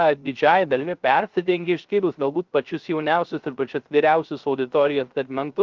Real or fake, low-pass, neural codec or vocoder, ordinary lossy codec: fake; 7.2 kHz; codec, 16 kHz, 0.3 kbps, FocalCodec; Opus, 32 kbps